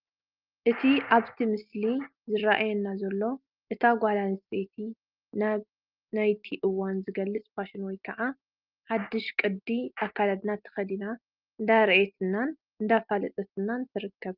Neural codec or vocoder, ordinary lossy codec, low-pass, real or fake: none; Opus, 32 kbps; 5.4 kHz; real